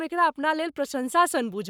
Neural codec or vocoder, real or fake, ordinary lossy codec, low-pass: codec, 44.1 kHz, 7.8 kbps, Pupu-Codec; fake; none; 19.8 kHz